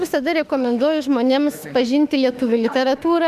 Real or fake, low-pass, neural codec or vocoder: fake; 14.4 kHz; autoencoder, 48 kHz, 32 numbers a frame, DAC-VAE, trained on Japanese speech